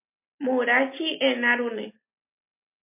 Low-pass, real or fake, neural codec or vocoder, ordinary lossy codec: 3.6 kHz; real; none; MP3, 24 kbps